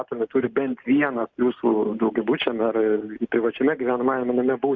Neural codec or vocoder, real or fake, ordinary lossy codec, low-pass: none; real; Opus, 64 kbps; 7.2 kHz